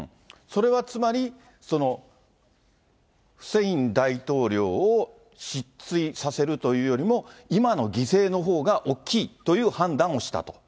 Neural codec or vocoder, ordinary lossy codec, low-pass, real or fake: none; none; none; real